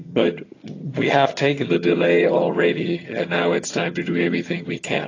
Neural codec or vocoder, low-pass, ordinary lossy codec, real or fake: vocoder, 22.05 kHz, 80 mel bands, HiFi-GAN; 7.2 kHz; AAC, 32 kbps; fake